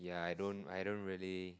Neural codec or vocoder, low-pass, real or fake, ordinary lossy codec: none; none; real; none